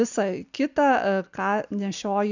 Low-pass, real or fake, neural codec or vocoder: 7.2 kHz; real; none